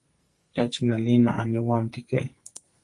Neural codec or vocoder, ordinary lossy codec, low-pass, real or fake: codec, 44.1 kHz, 2.6 kbps, SNAC; Opus, 32 kbps; 10.8 kHz; fake